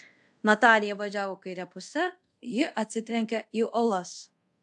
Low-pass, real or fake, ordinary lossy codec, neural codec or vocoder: 10.8 kHz; fake; AAC, 64 kbps; codec, 24 kHz, 0.5 kbps, DualCodec